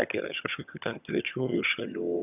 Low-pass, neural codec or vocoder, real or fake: 3.6 kHz; vocoder, 22.05 kHz, 80 mel bands, HiFi-GAN; fake